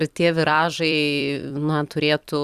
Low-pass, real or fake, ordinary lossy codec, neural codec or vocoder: 14.4 kHz; fake; Opus, 64 kbps; vocoder, 44.1 kHz, 128 mel bands every 256 samples, BigVGAN v2